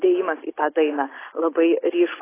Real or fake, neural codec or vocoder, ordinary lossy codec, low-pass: real; none; AAC, 16 kbps; 3.6 kHz